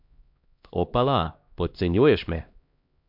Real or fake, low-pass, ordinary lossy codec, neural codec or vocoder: fake; 5.4 kHz; MP3, 48 kbps; codec, 16 kHz, 1 kbps, X-Codec, HuBERT features, trained on LibriSpeech